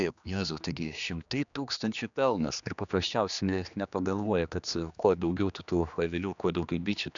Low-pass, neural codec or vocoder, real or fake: 7.2 kHz; codec, 16 kHz, 2 kbps, X-Codec, HuBERT features, trained on general audio; fake